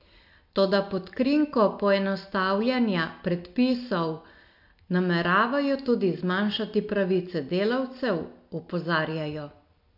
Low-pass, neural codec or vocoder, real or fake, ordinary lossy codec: 5.4 kHz; none; real; MP3, 48 kbps